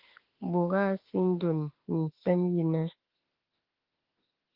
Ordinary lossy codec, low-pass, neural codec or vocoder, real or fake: Opus, 16 kbps; 5.4 kHz; autoencoder, 48 kHz, 32 numbers a frame, DAC-VAE, trained on Japanese speech; fake